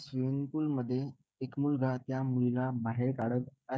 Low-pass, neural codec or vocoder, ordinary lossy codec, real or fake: none; codec, 16 kHz, 16 kbps, FreqCodec, smaller model; none; fake